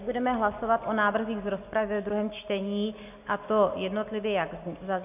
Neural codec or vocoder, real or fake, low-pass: none; real; 3.6 kHz